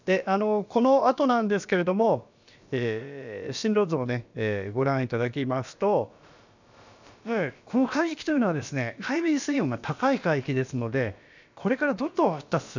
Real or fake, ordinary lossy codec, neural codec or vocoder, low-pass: fake; none; codec, 16 kHz, about 1 kbps, DyCAST, with the encoder's durations; 7.2 kHz